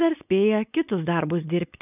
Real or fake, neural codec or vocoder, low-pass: fake; codec, 16 kHz, 4.8 kbps, FACodec; 3.6 kHz